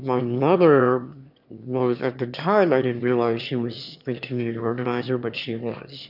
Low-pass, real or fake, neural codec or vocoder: 5.4 kHz; fake; autoencoder, 22.05 kHz, a latent of 192 numbers a frame, VITS, trained on one speaker